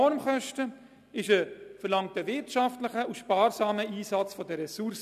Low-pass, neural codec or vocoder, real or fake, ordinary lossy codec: 14.4 kHz; none; real; none